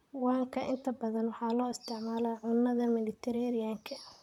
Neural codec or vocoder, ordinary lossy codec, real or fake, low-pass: vocoder, 44.1 kHz, 128 mel bands, Pupu-Vocoder; none; fake; 19.8 kHz